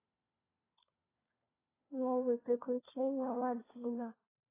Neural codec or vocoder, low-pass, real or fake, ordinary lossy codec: codec, 16 kHz, 4 kbps, FunCodec, trained on LibriTTS, 50 frames a second; 3.6 kHz; fake; AAC, 16 kbps